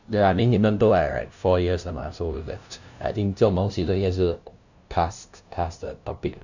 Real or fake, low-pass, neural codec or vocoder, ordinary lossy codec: fake; 7.2 kHz; codec, 16 kHz, 0.5 kbps, FunCodec, trained on LibriTTS, 25 frames a second; none